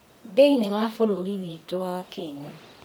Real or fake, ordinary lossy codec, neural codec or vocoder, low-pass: fake; none; codec, 44.1 kHz, 1.7 kbps, Pupu-Codec; none